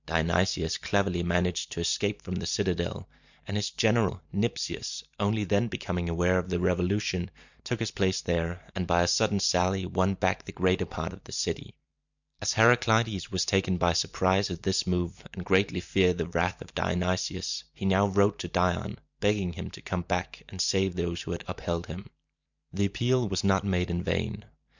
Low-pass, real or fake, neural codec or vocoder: 7.2 kHz; fake; vocoder, 44.1 kHz, 128 mel bands every 512 samples, BigVGAN v2